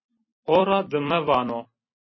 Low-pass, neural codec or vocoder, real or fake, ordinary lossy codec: 7.2 kHz; vocoder, 44.1 kHz, 128 mel bands every 256 samples, BigVGAN v2; fake; MP3, 24 kbps